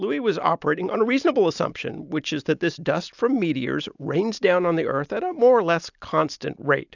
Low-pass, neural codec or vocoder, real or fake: 7.2 kHz; none; real